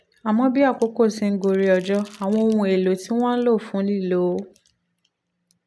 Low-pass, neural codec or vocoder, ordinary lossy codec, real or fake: none; none; none; real